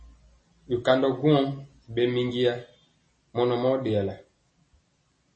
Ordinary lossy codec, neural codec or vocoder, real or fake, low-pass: MP3, 32 kbps; none; real; 9.9 kHz